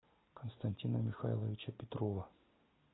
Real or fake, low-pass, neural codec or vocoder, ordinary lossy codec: real; 7.2 kHz; none; AAC, 16 kbps